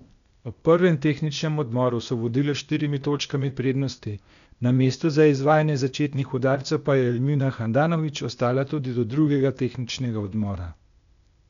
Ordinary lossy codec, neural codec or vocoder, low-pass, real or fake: none; codec, 16 kHz, 0.8 kbps, ZipCodec; 7.2 kHz; fake